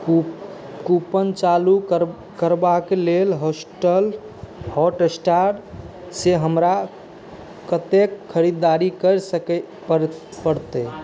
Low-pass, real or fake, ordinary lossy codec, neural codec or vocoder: none; real; none; none